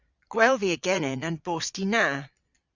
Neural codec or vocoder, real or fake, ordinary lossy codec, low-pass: vocoder, 22.05 kHz, 80 mel bands, Vocos; fake; Opus, 64 kbps; 7.2 kHz